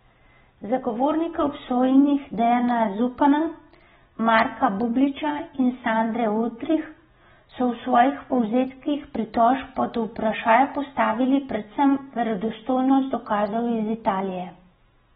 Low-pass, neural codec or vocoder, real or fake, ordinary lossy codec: 19.8 kHz; none; real; AAC, 16 kbps